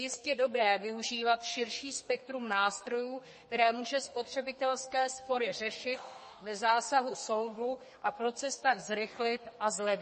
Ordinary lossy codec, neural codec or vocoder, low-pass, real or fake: MP3, 32 kbps; codec, 32 kHz, 1.9 kbps, SNAC; 10.8 kHz; fake